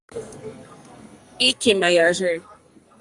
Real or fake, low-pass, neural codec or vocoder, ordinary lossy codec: fake; 10.8 kHz; codec, 44.1 kHz, 2.6 kbps, SNAC; Opus, 64 kbps